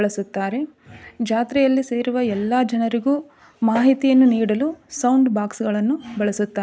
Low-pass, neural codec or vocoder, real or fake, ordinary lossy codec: none; none; real; none